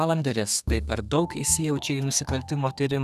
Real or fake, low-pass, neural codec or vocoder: fake; 14.4 kHz; codec, 32 kHz, 1.9 kbps, SNAC